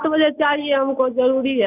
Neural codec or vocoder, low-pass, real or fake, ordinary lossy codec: none; 3.6 kHz; real; none